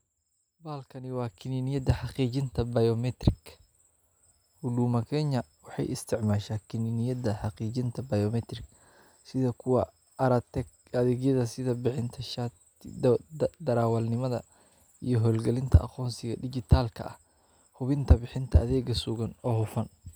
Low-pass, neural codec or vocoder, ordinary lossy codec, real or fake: none; none; none; real